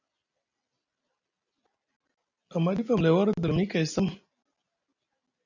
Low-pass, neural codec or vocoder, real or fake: 7.2 kHz; none; real